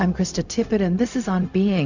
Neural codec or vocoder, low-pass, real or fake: codec, 16 kHz, 0.4 kbps, LongCat-Audio-Codec; 7.2 kHz; fake